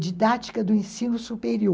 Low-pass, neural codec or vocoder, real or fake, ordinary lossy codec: none; none; real; none